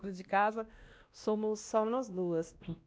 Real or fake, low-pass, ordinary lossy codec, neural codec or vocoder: fake; none; none; codec, 16 kHz, 1 kbps, X-Codec, WavLM features, trained on Multilingual LibriSpeech